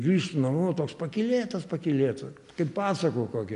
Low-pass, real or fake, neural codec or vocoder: 10.8 kHz; real; none